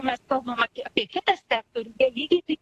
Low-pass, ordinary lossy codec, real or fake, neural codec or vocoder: 14.4 kHz; AAC, 64 kbps; fake; vocoder, 44.1 kHz, 128 mel bands every 256 samples, BigVGAN v2